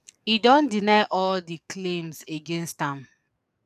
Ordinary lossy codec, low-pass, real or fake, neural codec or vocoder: none; 14.4 kHz; fake; codec, 44.1 kHz, 7.8 kbps, DAC